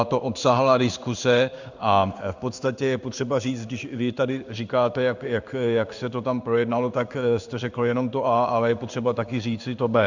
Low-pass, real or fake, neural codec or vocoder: 7.2 kHz; fake; codec, 16 kHz in and 24 kHz out, 1 kbps, XY-Tokenizer